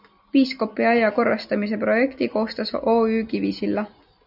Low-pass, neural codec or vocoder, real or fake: 5.4 kHz; none; real